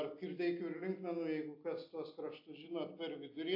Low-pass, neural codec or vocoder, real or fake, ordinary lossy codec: 5.4 kHz; none; real; AAC, 48 kbps